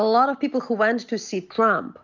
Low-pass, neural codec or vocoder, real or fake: 7.2 kHz; none; real